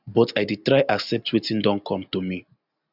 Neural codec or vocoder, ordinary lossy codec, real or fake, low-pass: none; none; real; 5.4 kHz